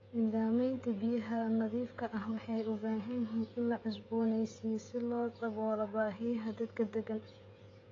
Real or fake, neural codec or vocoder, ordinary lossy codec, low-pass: fake; codec, 16 kHz, 16 kbps, FreqCodec, smaller model; AAC, 32 kbps; 7.2 kHz